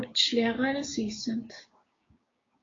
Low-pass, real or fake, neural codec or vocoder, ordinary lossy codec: 7.2 kHz; fake; codec, 16 kHz, 6 kbps, DAC; AAC, 32 kbps